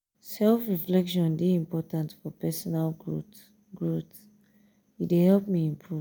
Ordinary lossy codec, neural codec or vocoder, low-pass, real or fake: none; none; none; real